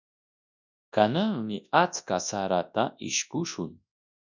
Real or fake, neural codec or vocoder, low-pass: fake; codec, 24 kHz, 0.9 kbps, WavTokenizer, large speech release; 7.2 kHz